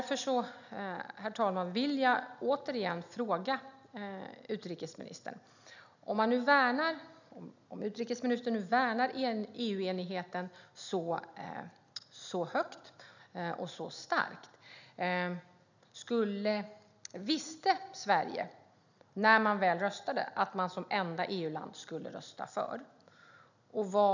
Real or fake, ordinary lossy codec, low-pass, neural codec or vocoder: real; none; 7.2 kHz; none